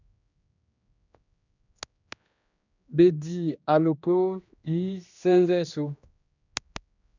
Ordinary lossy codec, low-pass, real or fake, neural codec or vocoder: none; 7.2 kHz; fake; codec, 16 kHz, 1 kbps, X-Codec, HuBERT features, trained on general audio